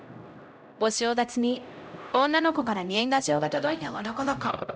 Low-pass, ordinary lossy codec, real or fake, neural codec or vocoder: none; none; fake; codec, 16 kHz, 0.5 kbps, X-Codec, HuBERT features, trained on LibriSpeech